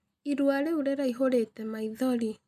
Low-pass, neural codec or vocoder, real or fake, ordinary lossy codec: 14.4 kHz; none; real; none